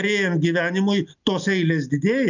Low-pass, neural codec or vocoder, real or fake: 7.2 kHz; none; real